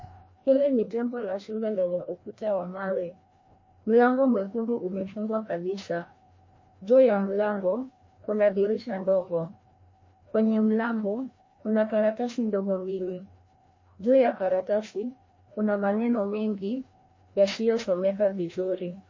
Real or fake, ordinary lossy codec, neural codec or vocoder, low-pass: fake; MP3, 32 kbps; codec, 16 kHz, 1 kbps, FreqCodec, larger model; 7.2 kHz